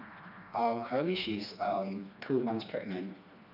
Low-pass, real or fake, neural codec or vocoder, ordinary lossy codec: 5.4 kHz; fake; codec, 16 kHz, 2 kbps, FreqCodec, smaller model; none